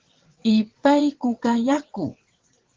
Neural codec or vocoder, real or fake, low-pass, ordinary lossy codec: vocoder, 22.05 kHz, 80 mel bands, WaveNeXt; fake; 7.2 kHz; Opus, 16 kbps